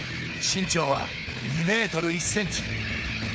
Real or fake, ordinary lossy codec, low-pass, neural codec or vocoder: fake; none; none; codec, 16 kHz, 4 kbps, FunCodec, trained on Chinese and English, 50 frames a second